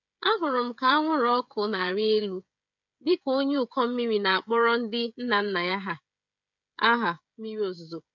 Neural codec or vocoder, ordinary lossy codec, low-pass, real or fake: codec, 16 kHz, 16 kbps, FreqCodec, smaller model; MP3, 64 kbps; 7.2 kHz; fake